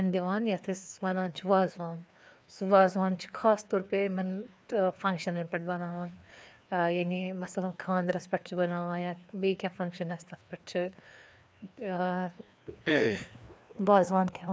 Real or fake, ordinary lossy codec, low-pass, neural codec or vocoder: fake; none; none; codec, 16 kHz, 2 kbps, FreqCodec, larger model